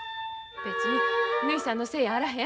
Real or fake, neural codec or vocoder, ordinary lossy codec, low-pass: real; none; none; none